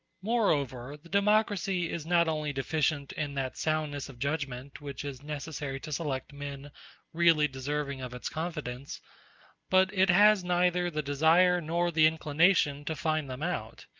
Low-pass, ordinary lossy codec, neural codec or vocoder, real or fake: 7.2 kHz; Opus, 24 kbps; none; real